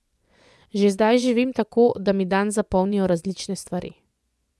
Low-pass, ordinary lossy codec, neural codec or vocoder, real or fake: none; none; vocoder, 24 kHz, 100 mel bands, Vocos; fake